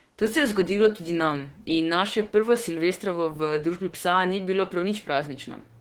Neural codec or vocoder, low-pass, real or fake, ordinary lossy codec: autoencoder, 48 kHz, 32 numbers a frame, DAC-VAE, trained on Japanese speech; 19.8 kHz; fake; Opus, 16 kbps